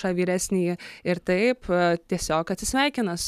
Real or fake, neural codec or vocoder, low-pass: real; none; 14.4 kHz